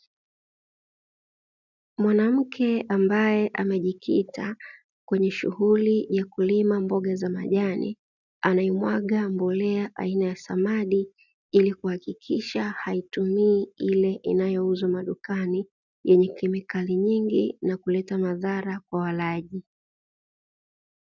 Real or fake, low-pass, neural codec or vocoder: real; 7.2 kHz; none